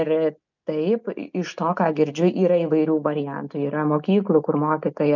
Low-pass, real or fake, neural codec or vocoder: 7.2 kHz; real; none